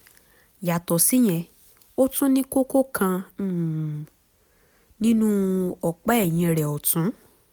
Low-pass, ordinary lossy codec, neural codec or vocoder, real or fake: none; none; none; real